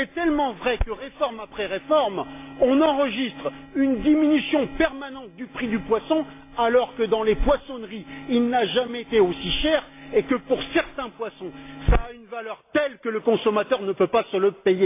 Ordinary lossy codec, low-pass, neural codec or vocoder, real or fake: AAC, 24 kbps; 3.6 kHz; none; real